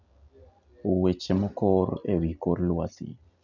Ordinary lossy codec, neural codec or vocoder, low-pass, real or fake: none; none; 7.2 kHz; real